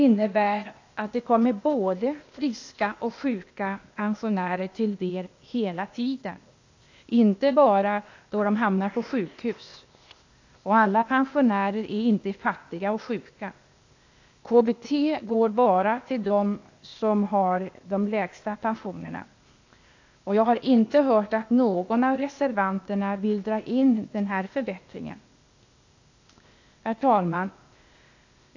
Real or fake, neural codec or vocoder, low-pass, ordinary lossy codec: fake; codec, 16 kHz, 0.8 kbps, ZipCodec; 7.2 kHz; AAC, 48 kbps